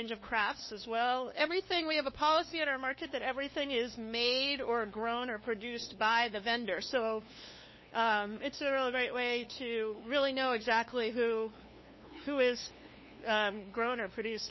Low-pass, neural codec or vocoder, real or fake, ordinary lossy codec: 7.2 kHz; codec, 16 kHz, 2 kbps, FunCodec, trained on LibriTTS, 25 frames a second; fake; MP3, 24 kbps